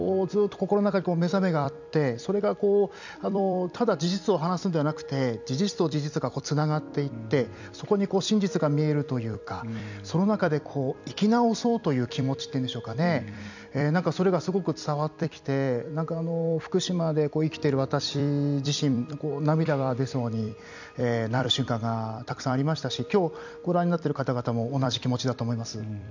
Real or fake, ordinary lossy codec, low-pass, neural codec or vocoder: fake; none; 7.2 kHz; vocoder, 44.1 kHz, 128 mel bands every 256 samples, BigVGAN v2